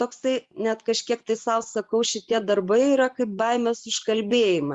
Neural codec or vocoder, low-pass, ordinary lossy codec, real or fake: vocoder, 24 kHz, 100 mel bands, Vocos; 10.8 kHz; Opus, 64 kbps; fake